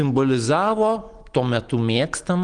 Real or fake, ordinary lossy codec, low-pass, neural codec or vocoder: real; Opus, 24 kbps; 9.9 kHz; none